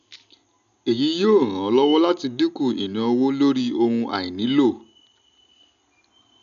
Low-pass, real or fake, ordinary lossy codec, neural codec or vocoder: 7.2 kHz; real; none; none